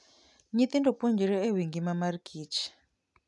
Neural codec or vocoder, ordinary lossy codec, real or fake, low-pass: none; none; real; 10.8 kHz